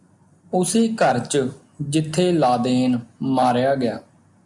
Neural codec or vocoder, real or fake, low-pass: none; real; 10.8 kHz